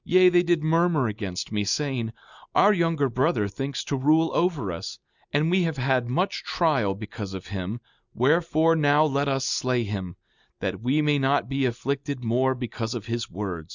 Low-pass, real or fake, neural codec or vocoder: 7.2 kHz; real; none